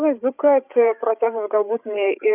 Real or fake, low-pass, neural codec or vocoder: fake; 3.6 kHz; codec, 16 kHz, 8 kbps, FreqCodec, larger model